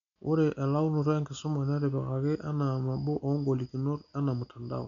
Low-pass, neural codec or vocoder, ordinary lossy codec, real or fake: 7.2 kHz; none; none; real